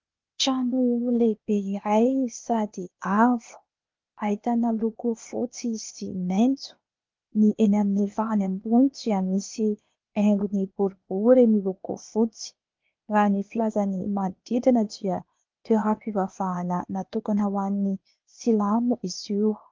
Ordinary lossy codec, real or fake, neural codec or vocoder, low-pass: Opus, 32 kbps; fake; codec, 16 kHz, 0.8 kbps, ZipCodec; 7.2 kHz